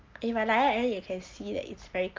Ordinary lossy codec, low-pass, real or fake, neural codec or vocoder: Opus, 32 kbps; 7.2 kHz; real; none